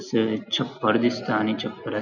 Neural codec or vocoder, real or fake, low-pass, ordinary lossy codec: none; real; 7.2 kHz; none